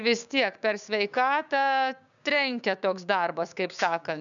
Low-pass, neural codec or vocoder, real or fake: 7.2 kHz; codec, 16 kHz, 6 kbps, DAC; fake